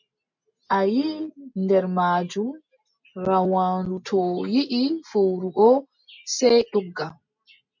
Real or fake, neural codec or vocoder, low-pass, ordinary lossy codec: real; none; 7.2 kHz; MP3, 64 kbps